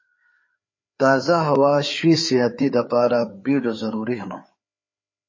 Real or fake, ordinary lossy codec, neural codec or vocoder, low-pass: fake; MP3, 32 kbps; codec, 16 kHz, 4 kbps, FreqCodec, larger model; 7.2 kHz